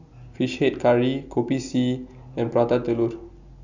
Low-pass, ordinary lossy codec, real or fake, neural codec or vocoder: 7.2 kHz; none; real; none